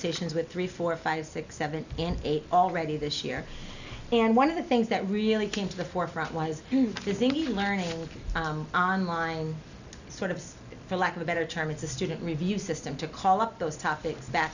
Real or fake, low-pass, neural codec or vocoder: real; 7.2 kHz; none